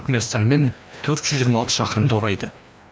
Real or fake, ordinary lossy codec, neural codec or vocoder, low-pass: fake; none; codec, 16 kHz, 1 kbps, FreqCodec, larger model; none